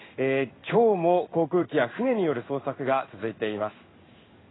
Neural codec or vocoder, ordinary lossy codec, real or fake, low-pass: codec, 16 kHz in and 24 kHz out, 1 kbps, XY-Tokenizer; AAC, 16 kbps; fake; 7.2 kHz